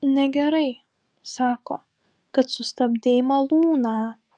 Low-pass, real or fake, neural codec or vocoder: 9.9 kHz; fake; codec, 44.1 kHz, 7.8 kbps, DAC